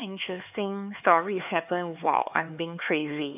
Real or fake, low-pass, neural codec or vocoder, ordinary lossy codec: fake; 3.6 kHz; codec, 16 kHz, 4 kbps, X-Codec, HuBERT features, trained on LibriSpeech; none